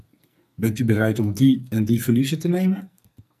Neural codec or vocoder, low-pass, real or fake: codec, 44.1 kHz, 2.6 kbps, SNAC; 14.4 kHz; fake